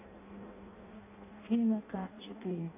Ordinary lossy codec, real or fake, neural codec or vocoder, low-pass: AAC, 24 kbps; fake; codec, 16 kHz in and 24 kHz out, 0.6 kbps, FireRedTTS-2 codec; 3.6 kHz